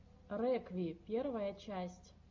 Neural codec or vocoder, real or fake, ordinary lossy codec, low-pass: none; real; MP3, 48 kbps; 7.2 kHz